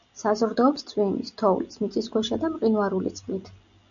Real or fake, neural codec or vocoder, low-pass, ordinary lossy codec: real; none; 7.2 kHz; MP3, 96 kbps